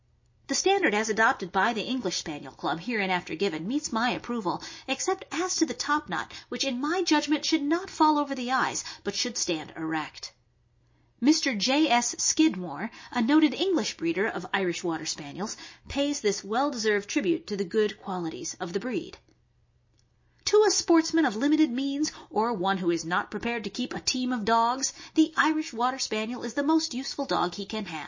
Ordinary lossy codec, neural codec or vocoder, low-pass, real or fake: MP3, 32 kbps; none; 7.2 kHz; real